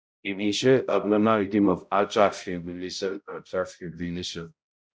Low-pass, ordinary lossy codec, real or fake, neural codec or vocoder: none; none; fake; codec, 16 kHz, 0.5 kbps, X-Codec, HuBERT features, trained on balanced general audio